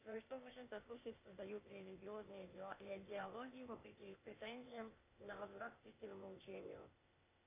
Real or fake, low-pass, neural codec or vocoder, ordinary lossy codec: fake; 3.6 kHz; codec, 16 kHz, 0.8 kbps, ZipCodec; AAC, 24 kbps